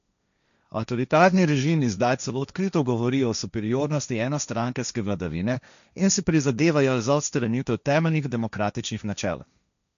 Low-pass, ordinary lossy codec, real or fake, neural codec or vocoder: 7.2 kHz; none; fake; codec, 16 kHz, 1.1 kbps, Voila-Tokenizer